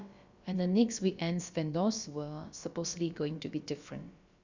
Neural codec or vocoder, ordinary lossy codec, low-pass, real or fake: codec, 16 kHz, about 1 kbps, DyCAST, with the encoder's durations; Opus, 64 kbps; 7.2 kHz; fake